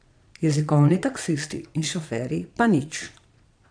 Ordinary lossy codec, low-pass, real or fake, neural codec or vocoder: none; 9.9 kHz; fake; vocoder, 22.05 kHz, 80 mel bands, Vocos